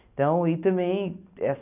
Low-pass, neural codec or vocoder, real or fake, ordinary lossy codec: 3.6 kHz; none; real; none